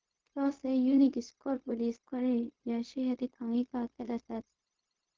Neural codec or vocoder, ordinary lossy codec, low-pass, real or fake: codec, 16 kHz, 0.4 kbps, LongCat-Audio-Codec; Opus, 16 kbps; 7.2 kHz; fake